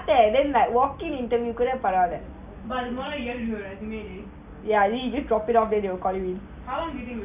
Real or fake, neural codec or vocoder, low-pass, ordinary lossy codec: real; none; 3.6 kHz; none